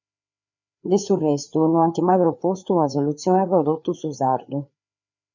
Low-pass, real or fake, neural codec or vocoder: 7.2 kHz; fake; codec, 16 kHz, 4 kbps, FreqCodec, larger model